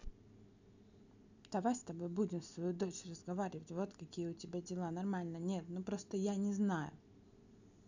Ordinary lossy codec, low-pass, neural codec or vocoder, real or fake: none; 7.2 kHz; none; real